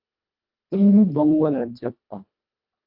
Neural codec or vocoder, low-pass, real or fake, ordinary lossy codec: codec, 24 kHz, 1.5 kbps, HILCodec; 5.4 kHz; fake; Opus, 32 kbps